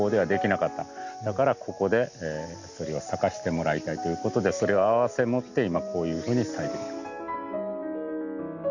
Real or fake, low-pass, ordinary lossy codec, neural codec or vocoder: real; 7.2 kHz; none; none